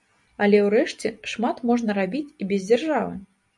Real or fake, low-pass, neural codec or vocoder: real; 10.8 kHz; none